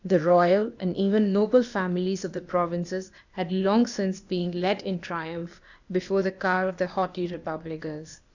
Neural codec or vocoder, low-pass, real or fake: codec, 16 kHz, 0.8 kbps, ZipCodec; 7.2 kHz; fake